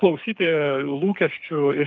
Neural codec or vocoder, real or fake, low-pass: codec, 24 kHz, 6 kbps, HILCodec; fake; 7.2 kHz